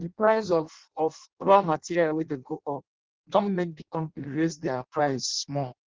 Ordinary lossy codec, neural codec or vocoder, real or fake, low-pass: Opus, 16 kbps; codec, 16 kHz in and 24 kHz out, 0.6 kbps, FireRedTTS-2 codec; fake; 7.2 kHz